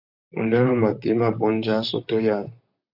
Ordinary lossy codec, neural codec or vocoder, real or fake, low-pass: AAC, 48 kbps; vocoder, 44.1 kHz, 128 mel bands, Pupu-Vocoder; fake; 5.4 kHz